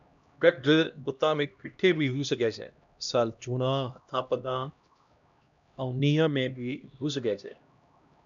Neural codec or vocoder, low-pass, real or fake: codec, 16 kHz, 1 kbps, X-Codec, HuBERT features, trained on LibriSpeech; 7.2 kHz; fake